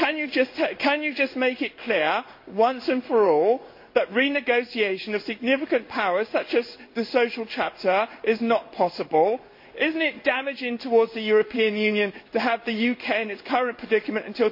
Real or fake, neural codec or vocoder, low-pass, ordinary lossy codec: fake; codec, 16 kHz in and 24 kHz out, 1 kbps, XY-Tokenizer; 5.4 kHz; MP3, 32 kbps